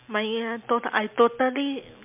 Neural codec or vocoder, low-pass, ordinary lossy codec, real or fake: none; 3.6 kHz; MP3, 32 kbps; real